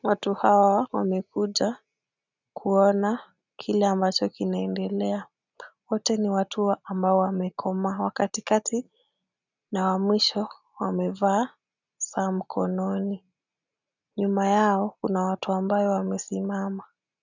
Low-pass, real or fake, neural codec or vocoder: 7.2 kHz; real; none